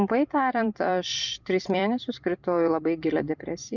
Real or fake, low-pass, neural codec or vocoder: fake; 7.2 kHz; vocoder, 44.1 kHz, 128 mel bands every 256 samples, BigVGAN v2